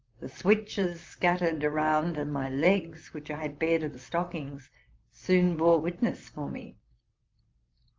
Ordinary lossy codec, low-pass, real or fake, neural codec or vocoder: Opus, 24 kbps; 7.2 kHz; fake; vocoder, 44.1 kHz, 128 mel bands, Pupu-Vocoder